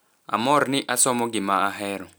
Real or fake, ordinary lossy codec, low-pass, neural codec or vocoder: real; none; none; none